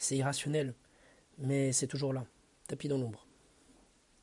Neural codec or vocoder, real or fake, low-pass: none; real; 10.8 kHz